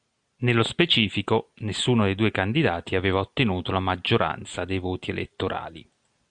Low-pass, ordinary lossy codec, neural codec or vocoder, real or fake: 9.9 kHz; Opus, 64 kbps; none; real